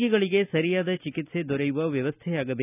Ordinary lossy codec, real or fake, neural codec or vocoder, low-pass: none; real; none; 3.6 kHz